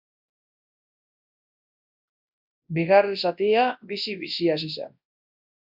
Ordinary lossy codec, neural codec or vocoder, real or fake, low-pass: AAC, 48 kbps; codec, 24 kHz, 0.9 kbps, WavTokenizer, large speech release; fake; 5.4 kHz